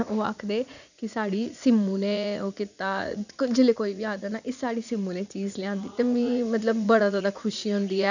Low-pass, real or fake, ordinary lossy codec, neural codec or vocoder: 7.2 kHz; fake; none; vocoder, 44.1 kHz, 80 mel bands, Vocos